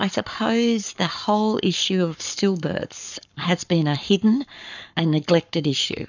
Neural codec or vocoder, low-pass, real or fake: codec, 44.1 kHz, 7.8 kbps, Pupu-Codec; 7.2 kHz; fake